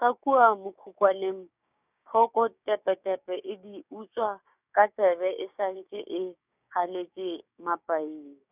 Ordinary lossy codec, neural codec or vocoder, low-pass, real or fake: none; codec, 16 kHz, 6 kbps, DAC; 3.6 kHz; fake